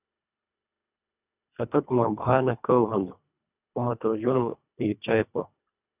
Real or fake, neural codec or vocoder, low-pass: fake; codec, 24 kHz, 1.5 kbps, HILCodec; 3.6 kHz